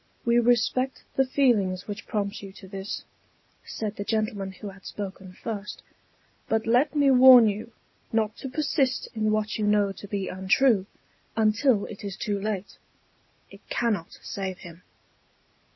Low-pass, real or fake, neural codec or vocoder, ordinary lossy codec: 7.2 kHz; real; none; MP3, 24 kbps